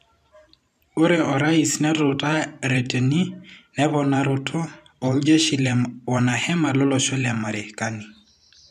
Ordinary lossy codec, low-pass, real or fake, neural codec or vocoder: none; 14.4 kHz; fake; vocoder, 44.1 kHz, 128 mel bands every 512 samples, BigVGAN v2